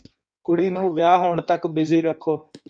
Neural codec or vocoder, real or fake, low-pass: codec, 16 kHz in and 24 kHz out, 1.1 kbps, FireRedTTS-2 codec; fake; 9.9 kHz